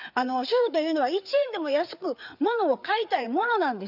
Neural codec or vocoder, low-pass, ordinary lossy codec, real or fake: codec, 16 kHz, 4 kbps, FreqCodec, larger model; 5.4 kHz; none; fake